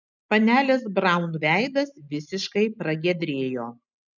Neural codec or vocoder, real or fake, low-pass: none; real; 7.2 kHz